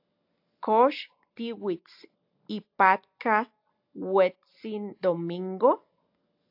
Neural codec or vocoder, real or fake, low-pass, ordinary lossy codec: none; real; 5.4 kHz; AAC, 48 kbps